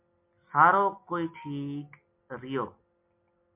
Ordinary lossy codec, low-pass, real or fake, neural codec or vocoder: MP3, 32 kbps; 3.6 kHz; real; none